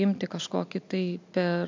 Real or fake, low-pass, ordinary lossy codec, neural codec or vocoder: real; 7.2 kHz; MP3, 64 kbps; none